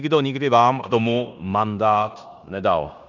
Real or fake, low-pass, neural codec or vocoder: fake; 7.2 kHz; codec, 16 kHz in and 24 kHz out, 0.9 kbps, LongCat-Audio-Codec, fine tuned four codebook decoder